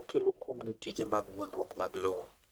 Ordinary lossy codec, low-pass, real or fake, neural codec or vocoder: none; none; fake; codec, 44.1 kHz, 1.7 kbps, Pupu-Codec